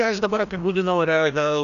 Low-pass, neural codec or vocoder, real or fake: 7.2 kHz; codec, 16 kHz, 1 kbps, FreqCodec, larger model; fake